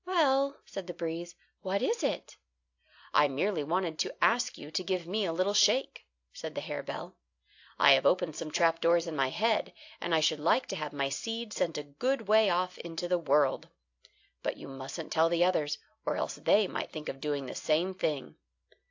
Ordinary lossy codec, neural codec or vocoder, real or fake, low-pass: AAC, 48 kbps; none; real; 7.2 kHz